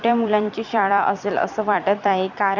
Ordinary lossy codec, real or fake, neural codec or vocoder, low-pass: none; fake; vocoder, 44.1 kHz, 128 mel bands every 256 samples, BigVGAN v2; 7.2 kHz